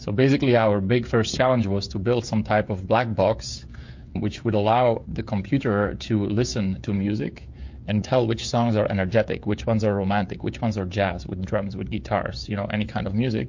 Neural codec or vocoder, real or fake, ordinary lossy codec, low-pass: codec, 16 kHz, 16 kbps, FreqCodec, smaller model; fake; MP3, 48 kbps; 7.2 kHz